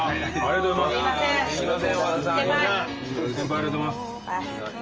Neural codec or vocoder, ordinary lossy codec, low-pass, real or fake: none; Opus, 24 kbps; 7.2 kHz; real